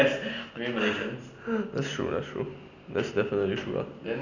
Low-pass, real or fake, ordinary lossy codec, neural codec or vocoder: 7.2 kHz; real; none; none